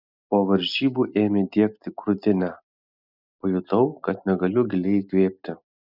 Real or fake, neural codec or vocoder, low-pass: real; none; 5.4 kHz